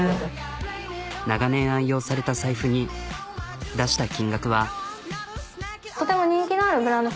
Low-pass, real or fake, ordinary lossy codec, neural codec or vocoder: none; real; none; none